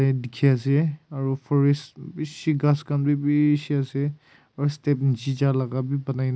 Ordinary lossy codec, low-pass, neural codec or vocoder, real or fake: none; none; none; real